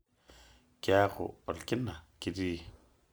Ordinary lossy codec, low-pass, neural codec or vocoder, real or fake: none; none; none; real